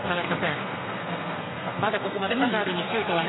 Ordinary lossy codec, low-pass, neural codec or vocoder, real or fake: AAC, 16 kbps; 7.2 kHz; codec, 32 kHz, 1.9 kbps, SNAC; fake